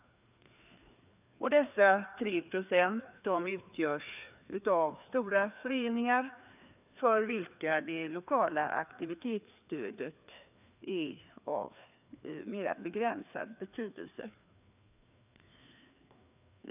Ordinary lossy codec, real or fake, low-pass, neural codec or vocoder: none; fake; 3.6 kHz; codec, 16 kHz, 2 kbps, FreqCodec, larger model